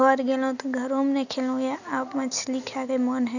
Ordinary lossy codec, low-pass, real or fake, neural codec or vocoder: none; 7.2 kHz; real; none